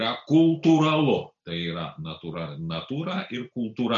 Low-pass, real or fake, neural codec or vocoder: 7.2 kHz; real; none